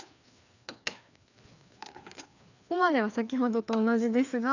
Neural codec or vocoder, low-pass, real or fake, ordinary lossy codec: codec, 16 kHz, 2 kbps, FreqCodec, larger model; 7.2 kHz; fake; none